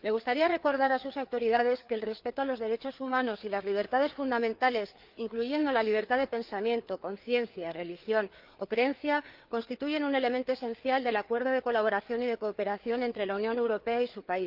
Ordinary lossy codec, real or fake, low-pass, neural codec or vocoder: Opus, 32 kbps; fake; 5.4 kHz; codec, 16 kHz, 4 kbps, FreqCodec, larger model